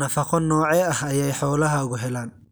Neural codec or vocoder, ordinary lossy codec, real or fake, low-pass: none; none; real; none